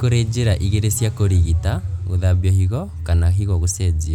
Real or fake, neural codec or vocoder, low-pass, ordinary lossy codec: real; none; 19.8 kHz; none